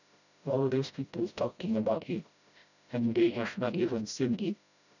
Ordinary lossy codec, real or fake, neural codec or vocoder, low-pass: none; fake; codec, 16 kHz, 0.5 kbps, FreqCodec, smaller model; 7.2 kHz